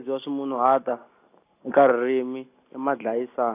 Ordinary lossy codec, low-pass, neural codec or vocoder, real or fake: AAC, 24 kbps; 3.6 kHz; none; real